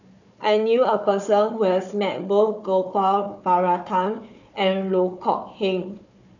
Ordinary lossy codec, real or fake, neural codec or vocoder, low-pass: none; fake; codec, 16 kHz, 4 kbps, FunCodec, trained on Chinese and English, 50 frames a second; 7.2 kHz